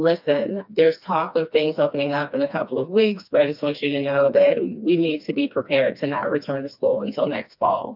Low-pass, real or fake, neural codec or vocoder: 5.4 kHz; fake; codec, 16 kHz, 2 kbps, FreqCodec, smaller model